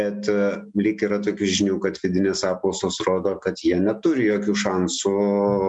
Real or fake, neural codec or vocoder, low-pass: real; none; 10.8 kHz